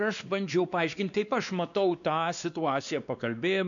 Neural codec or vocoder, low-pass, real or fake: codec, 16 kHz, 2 kbps, X-Codec, WavLM features, trained on Multilingual LibriSpeech; 7.2 kHz; fake